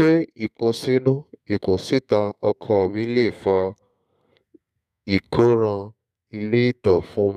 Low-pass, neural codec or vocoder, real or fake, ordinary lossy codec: 14.4 kHz; codec, 32 kHz, 1.9 kbps, SNAC; fake; none